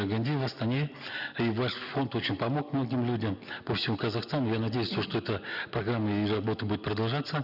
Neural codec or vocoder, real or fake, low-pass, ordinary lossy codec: none; real; 5.4 kHz; none